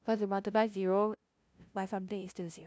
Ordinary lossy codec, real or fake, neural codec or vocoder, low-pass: none; fake; codec, 16 kHz, 0.5 kbps, FunCodec, trained on LibriTTS, 25 frames a second; none